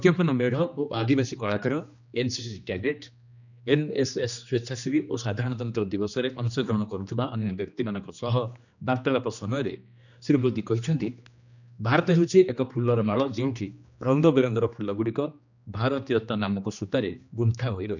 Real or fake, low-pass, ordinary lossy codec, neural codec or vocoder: fake; 7.2 kHz; none; codec, 16 kHz, 2 kbps, X-Codec, HuBERT features, trained on general audio